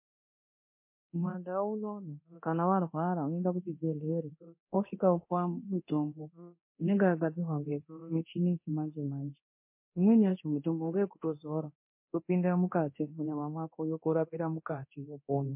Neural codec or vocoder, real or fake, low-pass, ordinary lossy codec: codec, 24 kHz, 0.9 kbps, DualCodec; fake; 3.6 kHz; MP3, 24 kbps